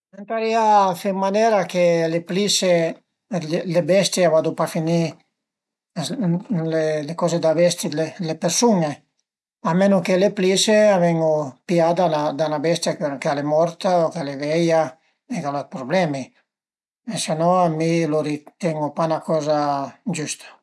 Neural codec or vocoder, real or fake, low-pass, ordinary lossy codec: none; real; none; none